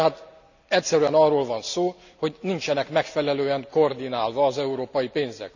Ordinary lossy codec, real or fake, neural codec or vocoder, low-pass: none; real; none; 7.2 kHz